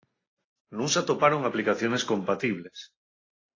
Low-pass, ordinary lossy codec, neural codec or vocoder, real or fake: 7.2 kHz; AAC, 32 kbps; none; real